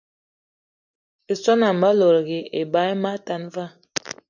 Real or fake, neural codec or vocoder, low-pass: real; none; 7.2 kHz